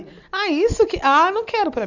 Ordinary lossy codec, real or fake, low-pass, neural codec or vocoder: none; real; 7.2 kHz; none